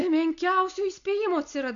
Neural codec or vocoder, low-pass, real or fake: none; 7.2 kHz; real